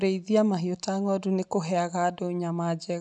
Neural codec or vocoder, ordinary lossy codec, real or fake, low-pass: none; none; real; 10.8 kHz